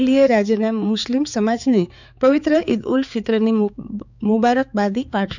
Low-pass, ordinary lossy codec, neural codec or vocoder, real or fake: 7.2 kHz; none; codec, 16 kHz, 4 kbps, X-Codec, HuBERT features, trained on balanced general audio; fake